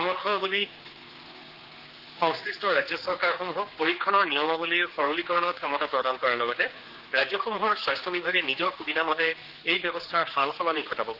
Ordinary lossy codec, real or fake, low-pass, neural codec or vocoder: Opus, 16 kbps; fake; 5.4 kHz; codec, 16 kHz, 4 kbps, X-Codec, HuBERT features, trained on general audio